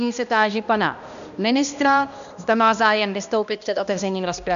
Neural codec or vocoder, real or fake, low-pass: codec, 16 kHz, 1 kbps, X-Codec, HuBERT features, trained on balanced general audio; fake; 7.2 kHz